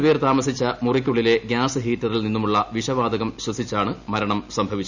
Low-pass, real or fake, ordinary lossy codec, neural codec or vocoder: 7.2 kHz; real; none; none